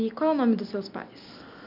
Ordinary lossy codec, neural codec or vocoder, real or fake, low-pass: none; none; real; 5.4 kHz